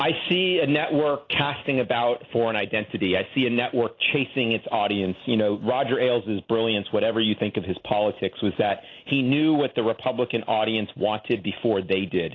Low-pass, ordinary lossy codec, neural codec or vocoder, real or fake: 7.2 kHz; AAC, 32 kbps; none; real